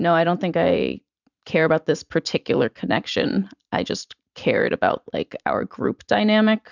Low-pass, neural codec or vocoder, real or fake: 7.2 kHz; vocoder, 44.1 kHz, 128 mel bands every 512 samples, BigVGAN v2; fake